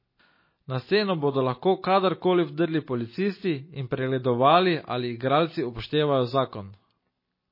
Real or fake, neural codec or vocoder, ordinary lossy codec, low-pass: real; none; MP3, 24 kbps; 5.4 kHz